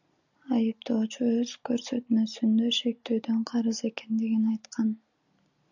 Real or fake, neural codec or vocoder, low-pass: real; none; 7.2 kHz